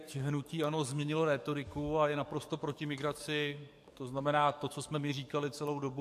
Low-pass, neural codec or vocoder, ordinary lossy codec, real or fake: 14.4 kHz; codec, 44.1 kHz, 7.8 kbps, DAC; MP3, 64 kbps; fake